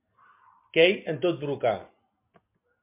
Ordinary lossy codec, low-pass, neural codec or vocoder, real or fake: MP3, 32 kbps; 3.6 kHz; none; real